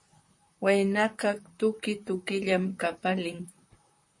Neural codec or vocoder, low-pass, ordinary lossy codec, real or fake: vocoder, 44.1 kHz, 128 mel bands, Pupu-Vocoder; 10.8 kHz; MP3, 48 kbps; fake